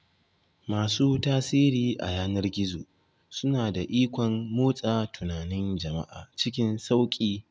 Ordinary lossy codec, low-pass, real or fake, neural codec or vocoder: none; none; real; none